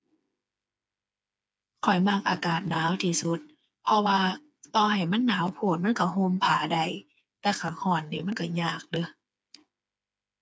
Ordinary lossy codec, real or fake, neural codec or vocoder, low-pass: none; fake; codec, 16 kHz, 4 kbps, FreqCodec, smaller model; none